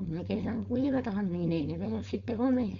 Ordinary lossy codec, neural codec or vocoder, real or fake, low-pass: MP3, 64 kbps; codec, 16 kHz, 4.8 kbps, FACodec; fake; 7.2 kHz